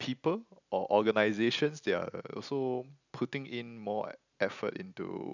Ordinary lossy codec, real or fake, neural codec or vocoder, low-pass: none; real; none; 7.2 kHz